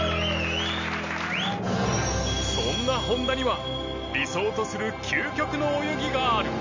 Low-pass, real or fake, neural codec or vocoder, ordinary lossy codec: 7.2 kHz; real; none; MP3, 48 kbps